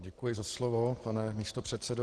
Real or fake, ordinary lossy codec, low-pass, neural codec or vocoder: real; Opus, 16 kbps; 10.8 kHz; none